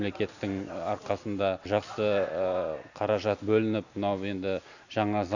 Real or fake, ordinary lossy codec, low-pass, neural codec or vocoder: real; none; 7.2 kHz; none